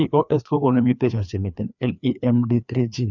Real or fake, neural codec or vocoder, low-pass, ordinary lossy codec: fake; codec, 16 kHz, 2 kbps, FreqCodec, larger model; 7.2 kHz; none